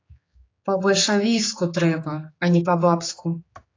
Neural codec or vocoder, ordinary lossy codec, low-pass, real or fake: codec, 16 kHz, 4 kbps, X-Codec, HuBERT features, trained on general audio; AAC, 32 kbps; 7.2 kHz; fake